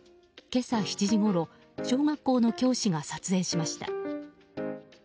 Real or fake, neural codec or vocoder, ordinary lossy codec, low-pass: real; none; none; none